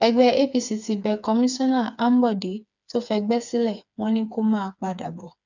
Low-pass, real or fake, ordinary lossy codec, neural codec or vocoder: 7.2 kHz; fake; none; codec, 16 kHz, 4 kbps, FreqCodec, smaller model